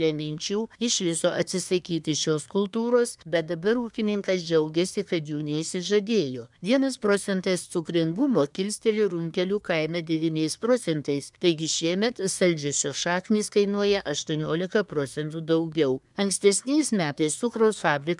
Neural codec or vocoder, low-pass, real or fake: codec, 24 kHz, 1 kbps, SNAC; 10.8 kHz; fake